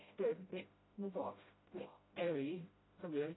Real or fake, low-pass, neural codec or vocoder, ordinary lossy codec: fake; 7.2 kHz; codec, 16 kHz, 0.5 kbps, FreqCodec, smaller model; AAC, 16 kbps